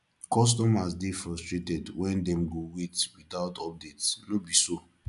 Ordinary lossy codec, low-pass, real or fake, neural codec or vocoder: none; 10.8 kHz; real; none